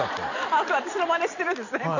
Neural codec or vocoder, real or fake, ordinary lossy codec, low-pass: vocoder, 44.1 kHz, 128 mel bands every 256 samples, BigVGAN v2; fake; none; 7.2 kHz